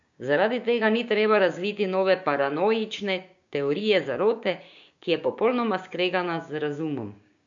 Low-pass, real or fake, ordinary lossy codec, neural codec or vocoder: 7.2 kHz; fake; AAC, 64 kbps; codec, 16 kHz, 6 kbps, DAC